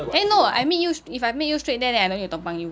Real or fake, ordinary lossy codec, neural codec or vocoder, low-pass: real; none; none; none